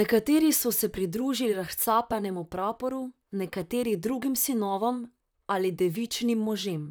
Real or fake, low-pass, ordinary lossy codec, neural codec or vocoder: fake; none; none; vocoder, 44.1 kHz, 128 mel bands, Pupu-Vocoder